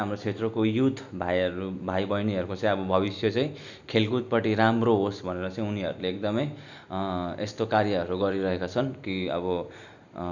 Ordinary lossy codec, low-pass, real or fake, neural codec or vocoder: none; 7.2 kHz; real; none